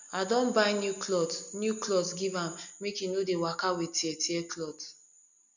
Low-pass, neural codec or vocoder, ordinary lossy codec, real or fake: 7.2 kHz; none; none; real